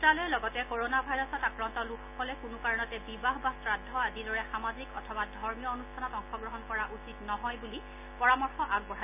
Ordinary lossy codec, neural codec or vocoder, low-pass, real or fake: none; none; 3.6 kHz; real